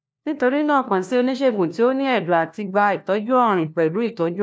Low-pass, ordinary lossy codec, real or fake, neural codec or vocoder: none; none; fake; codec, 16 kHz, 1 kbps, FunCodec, trained on LibriTTS, 50 frames a second